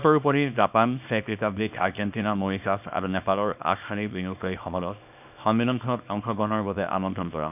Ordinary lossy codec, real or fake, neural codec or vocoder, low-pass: none; fake; codec, 24 kHz, 0.9 kbps, WavTokenizer, small release; 3.6 kHz